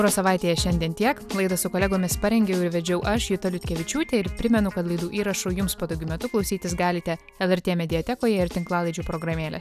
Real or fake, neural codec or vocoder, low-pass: real; none; 14.4 kHz